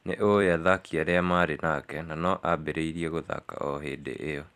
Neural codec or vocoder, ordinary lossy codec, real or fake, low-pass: vocoder, 44.1 kHz, 128 mel bands every 256 samples, BigVGAN v2; AAC, 96 kbps; fake; 14.4 kHz